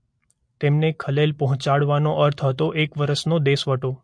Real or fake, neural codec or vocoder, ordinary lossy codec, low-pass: real; none; MP3, 48 kbps; 9.9 kHz